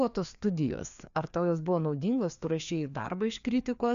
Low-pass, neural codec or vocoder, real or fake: 7.2 kHz; codec, 16 kHz, 2 kbps, FreqCodec, larger model; fake